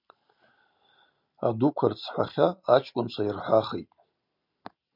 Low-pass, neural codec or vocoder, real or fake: 5.4 kHz; none; real